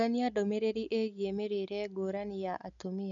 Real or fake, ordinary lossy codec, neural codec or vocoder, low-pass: real; none; none; 7.2 kHz